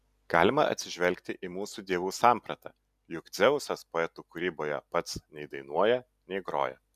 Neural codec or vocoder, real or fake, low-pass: none; real; 14.4 kHz